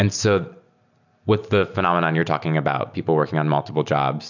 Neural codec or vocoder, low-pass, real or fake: none; 7.2 kHz; real